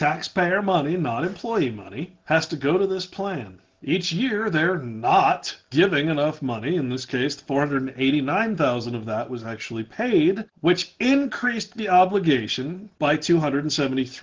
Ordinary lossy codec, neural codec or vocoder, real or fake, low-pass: Opus, 16 kbps; none; real; 7.2 kHz